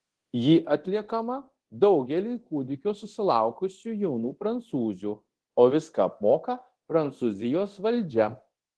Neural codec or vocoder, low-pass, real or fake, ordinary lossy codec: codec, 24 kHz, 0.9 kbps, DualCodec; 10.8 kHz; fake; Opus, 16 kbps